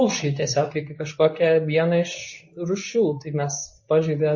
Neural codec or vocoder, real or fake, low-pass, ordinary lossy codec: codec, 24 kHz, 0.9 kbps, WavTokenizer, medium speech release version 2; fake; 7.2 kHz; MP3, 32 kbps